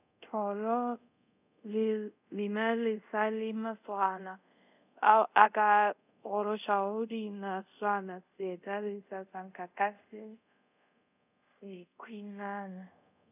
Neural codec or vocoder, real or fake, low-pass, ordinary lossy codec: codec, 24 kHz, 0.5 kbps, DualCodec; fake; 3.6 kHz; none